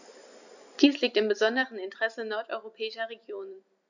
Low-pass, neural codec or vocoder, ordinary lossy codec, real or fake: 7.2 kHz; none; none; real